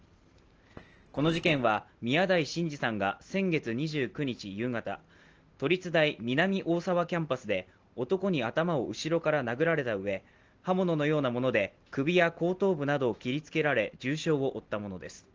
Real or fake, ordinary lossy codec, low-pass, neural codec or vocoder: real; Opus, 16 kbps; 7.2 kHz; none